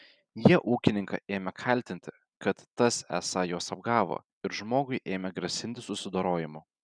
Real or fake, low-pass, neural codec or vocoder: real; 9.9 kHz; none